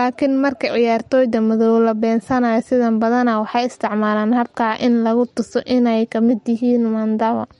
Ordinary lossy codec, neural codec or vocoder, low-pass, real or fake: MP3, 48 kbps; none; 19.8 kHz; real